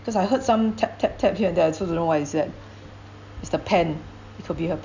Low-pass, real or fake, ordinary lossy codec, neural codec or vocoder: 7.2 kHz; real; none; none